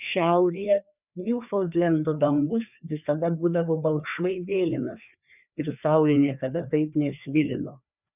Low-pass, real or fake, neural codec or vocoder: 3.6 kHz; fake; codec, 16 kHz, 2 kbps, FreqCodec, larger model